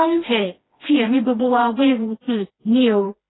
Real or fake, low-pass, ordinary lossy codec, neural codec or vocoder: fake; 7.2 kHz; AAC, 16 kbps; codec, 16 kHz, 1 kbps, FreqCodec, smaller model